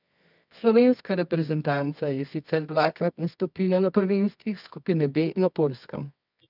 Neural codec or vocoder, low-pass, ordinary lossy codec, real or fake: codec, 24 kHz, 0.9 kbps, WavTokenizer, medium music audio release; 5.4 kHz; none; fake